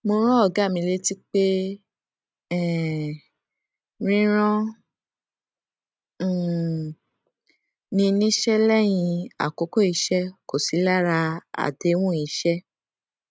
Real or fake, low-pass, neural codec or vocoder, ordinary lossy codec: real; none; none; none